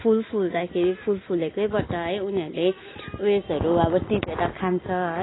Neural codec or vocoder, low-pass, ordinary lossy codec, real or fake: none; 7.2 kHz; AAC, 16 kbps; real